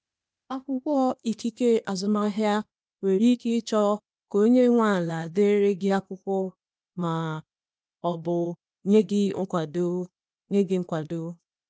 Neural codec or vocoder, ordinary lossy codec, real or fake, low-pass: codec, 16 kHz, 0.8 kbps, ZipCodec; none; fake; none